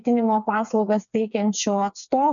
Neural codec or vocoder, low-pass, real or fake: codec, 16 kHz, 4 kbps, FreqCodec, smaller model; 7.2 kHz; fake